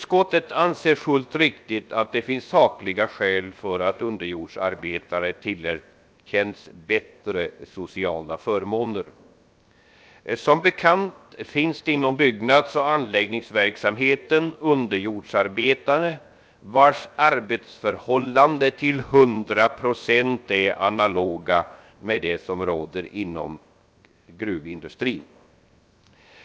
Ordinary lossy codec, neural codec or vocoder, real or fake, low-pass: none; codec, 16 kHz, 0.7 kbps, FocalCodec; fake; none